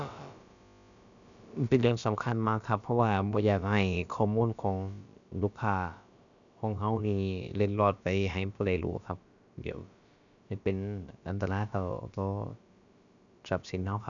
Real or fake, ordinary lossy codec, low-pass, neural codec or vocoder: fake; none; 7.2 kHz; codec, 16 kHz, about 1 kbps, DyCAST, with the encoder's durations